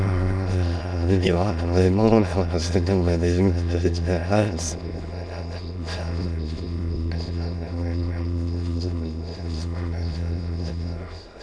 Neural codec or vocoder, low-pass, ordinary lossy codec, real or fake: autoencoder, 22.05 kHz, a latent of 192 numbers a frame, VITS, trained on many speakers; none; none; fake